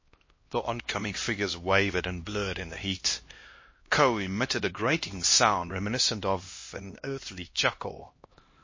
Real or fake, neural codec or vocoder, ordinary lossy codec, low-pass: fake; codec, 16 kHz, 1 kbps, X-Codec, HuBERT features, trained on LibriSpeech; MP3, 32 kbps; 7.2 kHz